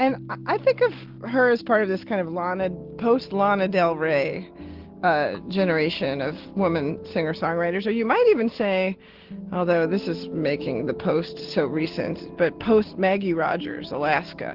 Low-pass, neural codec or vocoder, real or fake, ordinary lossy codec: 5.4 kHz; none; real; Opus, 16 kbps